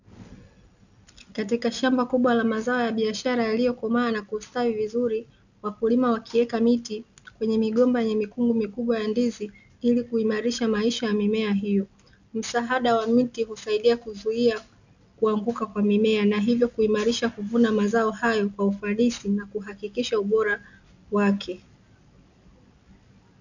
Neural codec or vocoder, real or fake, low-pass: none; real; 7.2 kHz